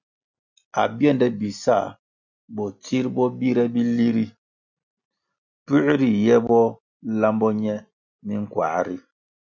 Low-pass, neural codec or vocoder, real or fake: 7.2 kHz; none; real